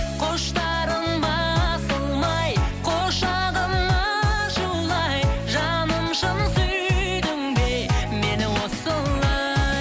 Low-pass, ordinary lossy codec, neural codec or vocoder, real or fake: none; none; none; real